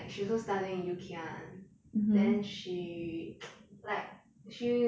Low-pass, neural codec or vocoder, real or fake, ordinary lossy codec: none; none; real; none